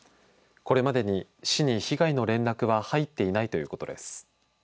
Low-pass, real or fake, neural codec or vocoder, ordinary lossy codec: none; real; none; none